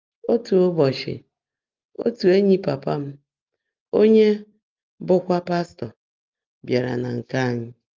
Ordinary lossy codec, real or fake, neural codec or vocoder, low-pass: Opus, 24 kbps; real; none; 7.2 kHz